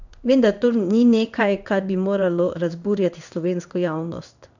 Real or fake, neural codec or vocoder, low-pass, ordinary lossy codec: fake; codec, 16 kHz in and 24 kHz out, 1 kbps, XY-Tokenizer; 7.2 kHz; none